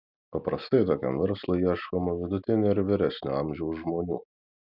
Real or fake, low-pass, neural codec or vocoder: real; 5.4 kHz; none